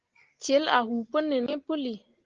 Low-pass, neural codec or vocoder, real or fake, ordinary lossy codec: 7.2 kHz; none; real; Opus, 16 kbps